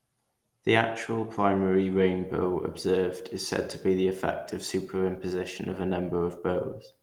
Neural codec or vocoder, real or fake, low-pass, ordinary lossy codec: none; real; 19.8 kHz; Opus, 24 kbps